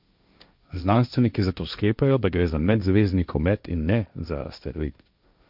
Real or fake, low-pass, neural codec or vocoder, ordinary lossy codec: fake; 5.4 kHz; codec, 16 kHz, 1.1 kbps, Voila-Tokenizer; none